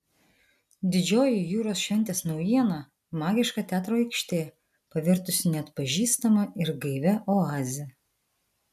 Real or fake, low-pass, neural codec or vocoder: real; 14.4 kHz; none